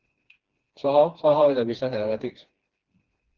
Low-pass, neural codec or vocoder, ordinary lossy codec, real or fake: 7.2 kHz; codec, 16 kHz, 2 kbps, FreqCodec, smaller model; Opus, 16 kbps; fake